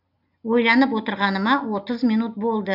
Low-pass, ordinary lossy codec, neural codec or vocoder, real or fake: 5.4 kHz; none; none; real